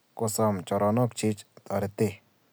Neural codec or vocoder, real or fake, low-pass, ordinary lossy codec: none; real; none; none